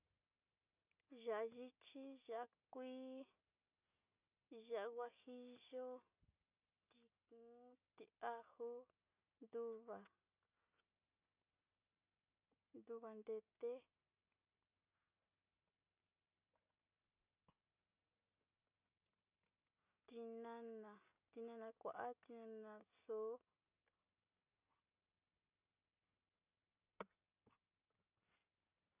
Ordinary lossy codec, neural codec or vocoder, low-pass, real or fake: none; none; 3.6 kHz; real